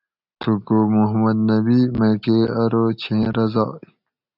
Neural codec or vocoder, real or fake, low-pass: none; real; 5.4 kHz